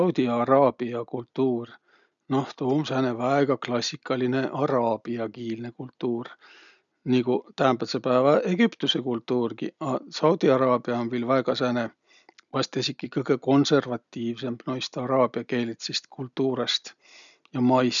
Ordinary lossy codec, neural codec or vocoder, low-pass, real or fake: none; none; 7.2 kHz; real